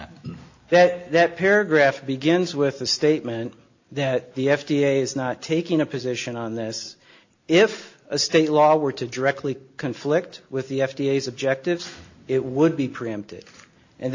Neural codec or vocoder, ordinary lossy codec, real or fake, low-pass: none; AAC, 48 kbps; real; 7.2 kHz